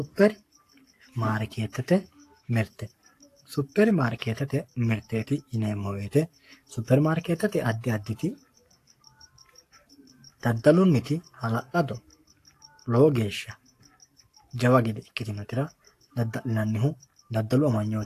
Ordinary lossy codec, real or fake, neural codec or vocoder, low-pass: AAC, 64 kbps; fake; codec, 44.1 kHz, 7.8 kbps, Pupu-Codec; 14.4 kHz